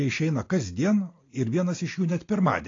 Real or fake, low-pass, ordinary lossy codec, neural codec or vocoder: real; 7.2 kHz; AAC, 32 kbps; none